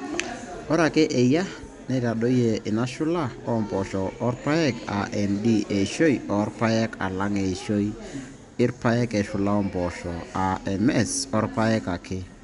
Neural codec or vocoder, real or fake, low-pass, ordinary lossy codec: none; real; 10.8 kHz; none